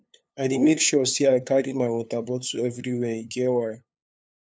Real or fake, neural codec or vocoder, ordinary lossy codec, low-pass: fake; codec, 16 kHz, 2 kbps, FunCodec, trained on LibriTTS, 25 frames a second; none; none